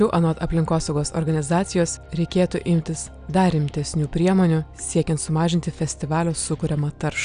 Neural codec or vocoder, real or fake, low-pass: none; real; 9.9 kHz